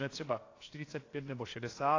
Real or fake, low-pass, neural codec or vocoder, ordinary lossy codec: fake; 7.2 kHz; codec, 16 kHz, about 1 kbps, DyCAST, with the encoder's durations; AAC, 32 kbps